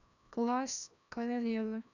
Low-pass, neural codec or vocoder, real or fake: 7.2 kHz; codec, 16 kHz, 1 kbps, FreqCodec, larger model; fake